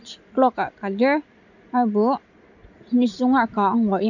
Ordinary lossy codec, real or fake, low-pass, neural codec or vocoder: none; real; 7.2 kHz; none